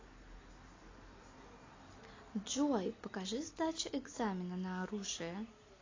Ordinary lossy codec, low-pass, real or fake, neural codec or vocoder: AAC, 32 kbps; 7.2 kHz; real; none